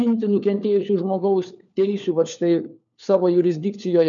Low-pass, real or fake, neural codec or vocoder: 7.2 kHz; fake; codec, 16 kHz, 4 kbps, FunCodec, trained on LibriTTS, 50 frames a second